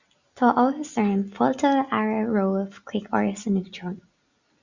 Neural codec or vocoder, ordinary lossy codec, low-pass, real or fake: none; Opus, 64 kbps; 7.2 kHz; real